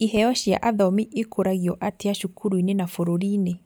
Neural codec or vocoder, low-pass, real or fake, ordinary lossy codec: none; none; real; none